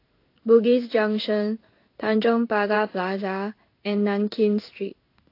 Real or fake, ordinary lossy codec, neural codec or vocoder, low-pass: fake; AAC, 32 kbps; codec, 16 kHz in and 24 kHz out, 1 kbps, XY-Tokenizer; 5.4 kHz